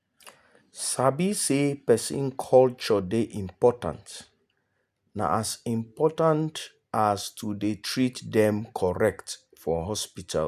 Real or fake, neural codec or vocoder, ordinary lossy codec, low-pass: real; none; none; 14.4 kHz